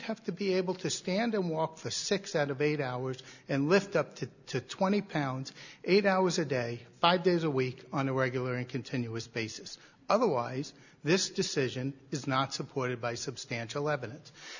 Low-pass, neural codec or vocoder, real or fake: 7.2 kHz; none; real